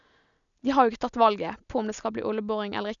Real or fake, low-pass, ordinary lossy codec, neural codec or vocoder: real; 7.2 kHz; none; none